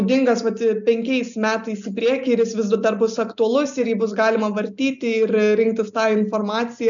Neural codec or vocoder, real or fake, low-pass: none; real; 7.2 kHz